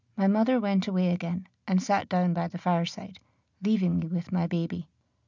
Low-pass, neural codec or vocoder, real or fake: 7.2 kHz; none; real